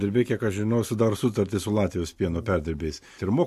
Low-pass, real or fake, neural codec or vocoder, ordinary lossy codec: 14.4 kHz; real; none; MP3, 64 kbps